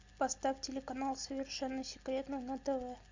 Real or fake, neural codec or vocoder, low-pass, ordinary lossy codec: real; none; 7.2 kHz; MP3, 64 kbps